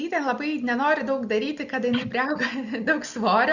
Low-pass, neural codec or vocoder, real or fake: 7.2 kHz; none; real